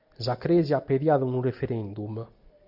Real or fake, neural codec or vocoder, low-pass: real; none; 5.4 kHz